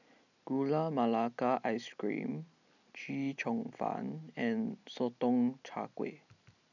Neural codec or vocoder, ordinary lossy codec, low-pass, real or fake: none; none; 7.2 kHz; real